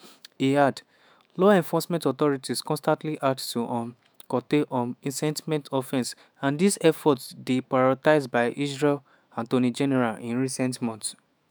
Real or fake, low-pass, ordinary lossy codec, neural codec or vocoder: fake; none; none; autoencoder, 48 kHz, 128 numbers a frame, DAC-VAE, trained on Japanese speech